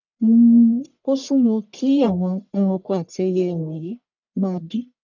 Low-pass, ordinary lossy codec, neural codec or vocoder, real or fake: 7.2 kHz; none; codec, 44.1 kHz, 1.7 kbps, Pupu-Codec; fake